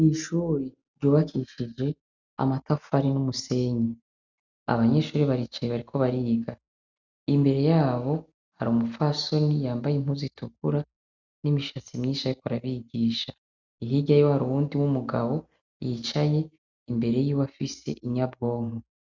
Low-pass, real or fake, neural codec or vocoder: 7.2 kHz; real; none